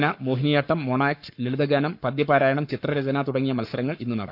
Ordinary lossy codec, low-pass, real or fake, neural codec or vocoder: none; 5.4 kHz; fake; codec, 44.1 kHz, 7.8 kbps, Pupu-Codec